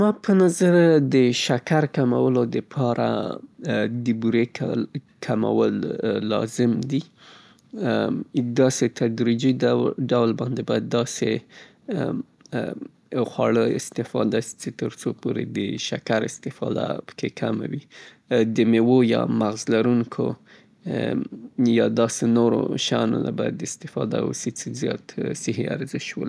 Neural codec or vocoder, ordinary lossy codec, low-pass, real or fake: none; none; none; real